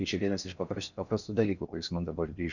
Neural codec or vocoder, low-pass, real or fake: codec, 16 kHz in and 24 kHz out, 0.6 kbps, FocalCodec, streaming, 4096 codes; 7.2 kHz; fake